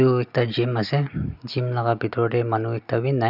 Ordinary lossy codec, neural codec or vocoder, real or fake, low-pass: none; none; real; 5.4 kHz